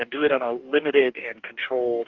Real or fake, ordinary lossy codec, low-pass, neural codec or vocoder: fake; Opus, 32 kbps; 7.2 kHz; codec, 44.1 kHz, 2.6 kbps, DAC